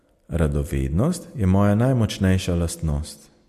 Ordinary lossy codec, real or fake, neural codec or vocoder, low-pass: MP3, 64 kbps; real; none; 14.4 kHz